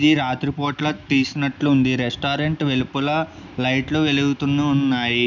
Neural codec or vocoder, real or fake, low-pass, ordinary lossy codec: none; real; 7.2 kHz; none